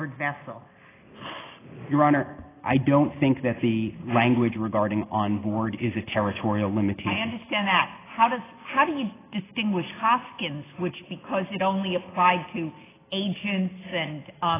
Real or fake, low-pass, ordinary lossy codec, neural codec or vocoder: real; 3.6 kHz; AAC, 16 kbps; none